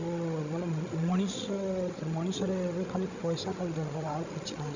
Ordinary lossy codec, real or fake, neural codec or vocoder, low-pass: none; fake; codec, 16 kHz, 16 kbps, FreqCodec, larger model; 7.2 kHz